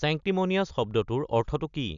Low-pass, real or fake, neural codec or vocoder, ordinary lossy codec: 7.2 kHz; real; none; none